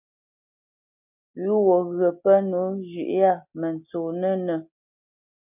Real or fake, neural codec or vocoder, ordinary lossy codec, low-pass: real; none; MP3, 32 kbps; 3.6 kHz